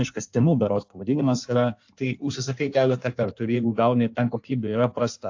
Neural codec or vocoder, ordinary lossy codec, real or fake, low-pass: codec, 16 kHz in and 24 kHz out, 1.1 kbps, FireRedTTS-2 codec; AAC, 48 kbps; fake; 7.2 kHz